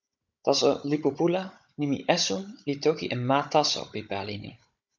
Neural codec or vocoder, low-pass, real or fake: codec, 16 kHz, 16 kbps, FunCodec, trained on Chinese and English, 50 frames a second; 7.2 kHz; fake